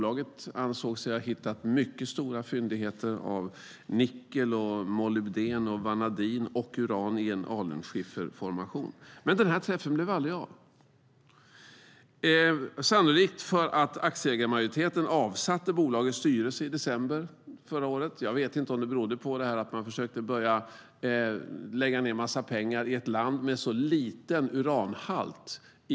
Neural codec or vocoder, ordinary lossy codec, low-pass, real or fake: none; none; none; real